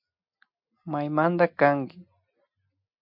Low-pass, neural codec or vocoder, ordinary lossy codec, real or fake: 5.4 kHz; none; MP3, 48 kbps; real